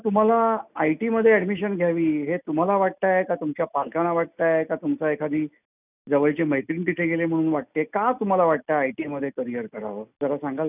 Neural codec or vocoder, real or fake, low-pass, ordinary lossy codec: none; real; 3.6 kHz; none